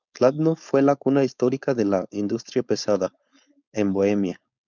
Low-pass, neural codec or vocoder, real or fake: 7.2 kHz; codec, 16 kHz, 4.8 kbps, FACodec; fake